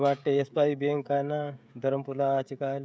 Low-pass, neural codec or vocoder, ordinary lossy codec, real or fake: none; codec, 16 kHz, 16 kbps, FreqCodec, smaller model; none; fake